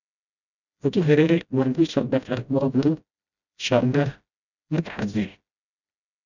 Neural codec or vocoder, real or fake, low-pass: codec, 16 kHz, 0.5 kbps, FreqCodec, smaller model; fake; 7.2 kHz